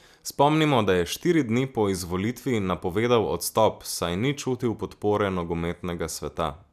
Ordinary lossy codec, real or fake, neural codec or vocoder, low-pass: none; real; none; 14.4 kHz